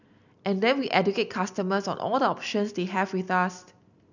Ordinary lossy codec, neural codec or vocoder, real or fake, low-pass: none; none; real; 7.2 kHz